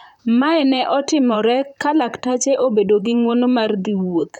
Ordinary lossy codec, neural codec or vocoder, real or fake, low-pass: none; vocoder, 44.1 kHz, 128 mel bands, Pupu-Vocoder; fake; 19.8 kHz